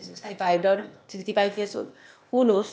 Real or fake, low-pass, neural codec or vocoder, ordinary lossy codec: fake; none; codec, 16 kHz, 0.8 kbps, ZipCodec; none